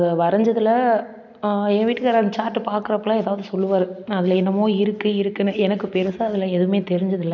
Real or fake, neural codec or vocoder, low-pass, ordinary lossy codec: real; none; 7.2 kHz; none